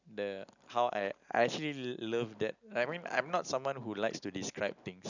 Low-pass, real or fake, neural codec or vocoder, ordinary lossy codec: 7.2 kHz; real; none; none